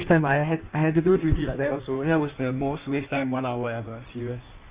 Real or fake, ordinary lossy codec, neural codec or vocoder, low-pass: fake; Opus, 64 kbps; codec, 16 kHz in and 24 kHz out, 1.1 kbps, FireRedTTS-2 codec; 3.6 kHz